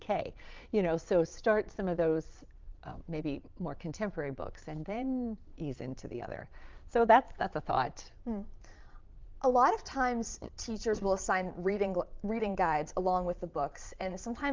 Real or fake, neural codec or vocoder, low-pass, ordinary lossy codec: fake; vocoder, 22.05 kHz, 80 mel bands, Vocos; 7.2 kHz; Opus, 24 kbps